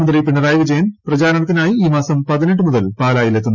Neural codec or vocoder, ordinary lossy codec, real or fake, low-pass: none; none; real; 7.2 kHz